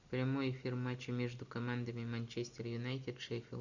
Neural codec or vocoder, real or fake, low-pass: none; real; 7.2 kHz